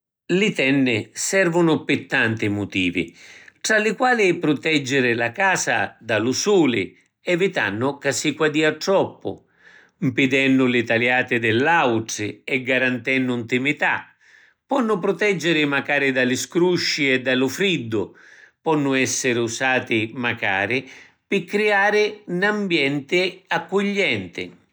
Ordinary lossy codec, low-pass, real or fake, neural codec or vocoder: none; none; real; none